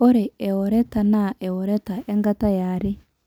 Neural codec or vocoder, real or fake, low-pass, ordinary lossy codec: none; real; 19.8 kHz; none